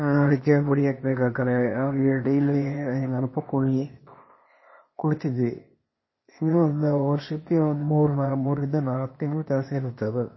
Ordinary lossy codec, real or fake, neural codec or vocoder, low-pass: MP3, 24 kbps; fake; codec, 16 kHz, 0.8 kbps, ZipCodec; 7.2 kHz